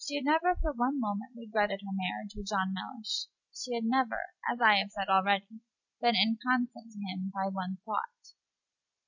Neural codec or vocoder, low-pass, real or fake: none; 7.2 kHz; real